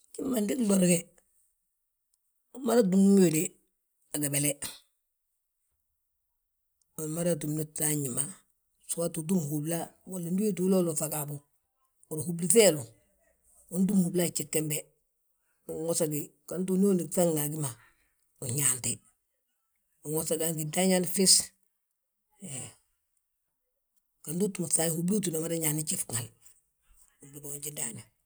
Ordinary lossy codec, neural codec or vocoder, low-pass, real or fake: none; none; none; real